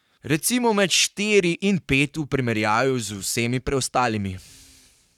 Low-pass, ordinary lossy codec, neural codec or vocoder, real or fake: 19.8 kHz; none; codec, 44.1 kHz, 7.8 kbps, Pupu-Codec; fake